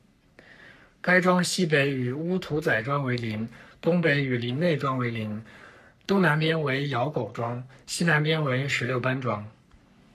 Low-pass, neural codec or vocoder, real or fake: 14.4 kHz; codec, 44.1 kHz, 3.4 kbps, Pupu-Codec; fake